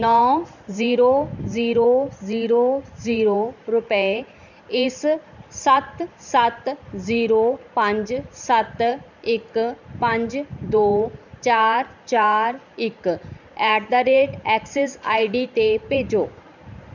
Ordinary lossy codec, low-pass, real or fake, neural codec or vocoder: none; 7.2 kHz; fake; vocoder, 44.1 kHz, 128 mel bands every 512 samples, BigVGAN v2